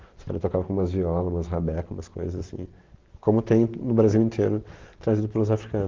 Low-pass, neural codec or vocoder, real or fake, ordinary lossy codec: 7.2 kHz; none; real; Opus, 16 kbps